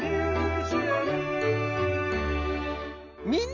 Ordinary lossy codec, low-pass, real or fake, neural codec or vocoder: none; 7.2 kHz; real; none